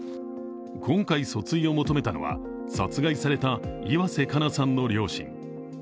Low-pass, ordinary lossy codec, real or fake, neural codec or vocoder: none; none; real; none